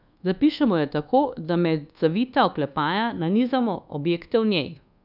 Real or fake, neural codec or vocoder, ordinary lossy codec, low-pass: fake; codec, 24 kHz, 1.2 kbps, DualCodec; none; 5.4 kHz